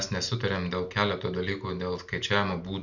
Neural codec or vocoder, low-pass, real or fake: none; 7.2 kHz; real